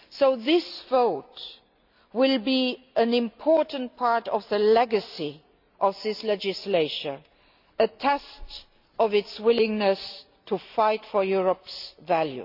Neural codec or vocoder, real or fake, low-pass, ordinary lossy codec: none; real; 5.4 kHz; none